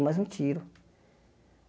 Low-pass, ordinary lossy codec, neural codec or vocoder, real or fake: none; none; none; real